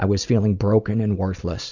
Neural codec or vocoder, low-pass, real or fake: none; 7.2 kHz; real